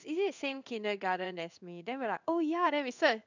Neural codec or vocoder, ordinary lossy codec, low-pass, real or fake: codec, 16 kHz in and 24 kHz out, 1 kbps, XY-Tokenizer; none; 7.2 kHz; fake